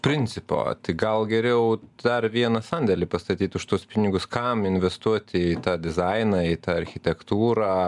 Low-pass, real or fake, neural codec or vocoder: 10.8 kHz; real; none